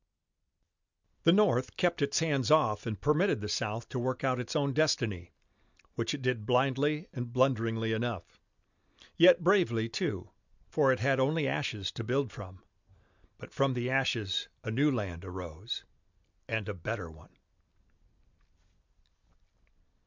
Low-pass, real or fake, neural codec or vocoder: 7.2 kHz; real; none